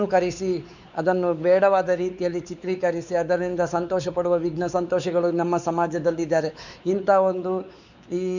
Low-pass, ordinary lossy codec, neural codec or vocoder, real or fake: 7.2 kHz; none; codec, 16 kHz, 4 kbps, X-Codec, WavLM features, trained on Multilingual LibriSpeech; fake